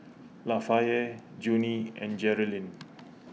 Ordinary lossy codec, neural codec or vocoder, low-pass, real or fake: none; none; none; real